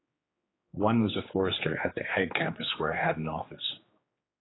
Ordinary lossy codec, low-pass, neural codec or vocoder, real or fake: AAC, 16 kbps; 7.2 kHz; codec, 16 kHz, 4 kbps, X-Codec, HuBERT features, trained on general audio; fake